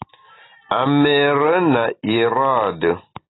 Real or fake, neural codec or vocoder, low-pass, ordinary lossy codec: real; none; 7.2 kHz; AAC, 16 kbps